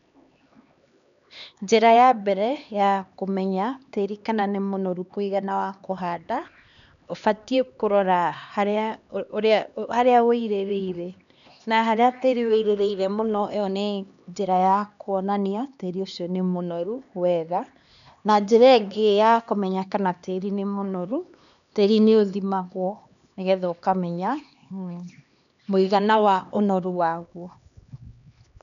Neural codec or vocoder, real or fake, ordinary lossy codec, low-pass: codec, 16 kHz, 2 kbps, X-Codec, HuBERT features, trained on LibriSpeech; fake; none; 7.2 kHz